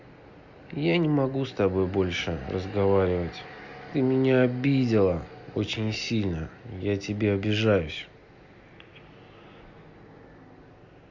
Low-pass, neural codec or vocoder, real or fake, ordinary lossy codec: 7.2 kHz; none; real; Opus, 64 kbps